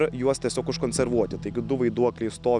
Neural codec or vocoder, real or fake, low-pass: none; real; 10.8 kHz